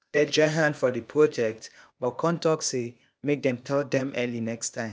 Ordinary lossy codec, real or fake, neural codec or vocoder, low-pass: none; fake; codec, 16 kHz, 0.8 kbps, ZipCodec; none